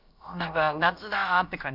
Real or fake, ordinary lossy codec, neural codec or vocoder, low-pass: fake; none; codec, 16 kHz, about 1 kbps, DyCAST, with the encoder's durations; 5.4 kHz